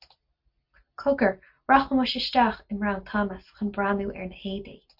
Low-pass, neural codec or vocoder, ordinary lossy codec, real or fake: 5.4 kHz; none; MP3, 48 kbps; real